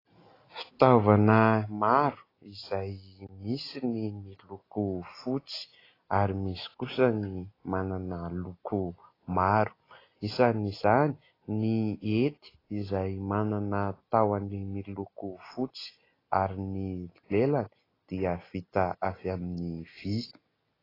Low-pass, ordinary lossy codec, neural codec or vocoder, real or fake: 5.4 kHz; AAC, 24 kbps; none; real